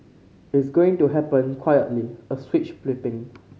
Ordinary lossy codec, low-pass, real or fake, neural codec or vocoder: none; none; real; none